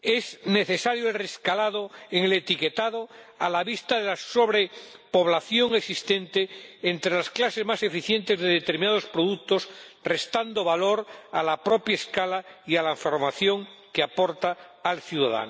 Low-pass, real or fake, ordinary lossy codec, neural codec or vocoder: none; real; none; none